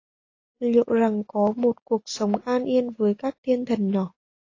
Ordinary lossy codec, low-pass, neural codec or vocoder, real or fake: AAC, 32 kbps; 7.2 kHz; none; real